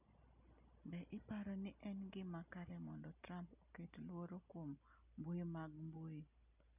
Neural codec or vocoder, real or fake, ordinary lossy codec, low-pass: none; real; none; 3.6 kHz